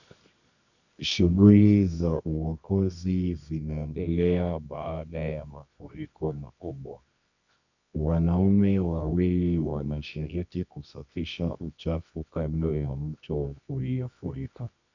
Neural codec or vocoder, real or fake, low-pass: codec, 24 kHz, 0.9 kbps, WavTokenizer, medium music audio release; fake; 7.2 kHz